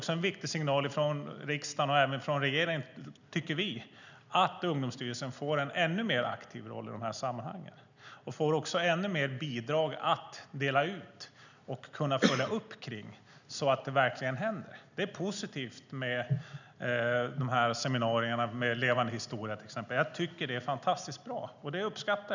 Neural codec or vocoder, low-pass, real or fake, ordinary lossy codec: none; 7.2 kHz; real; none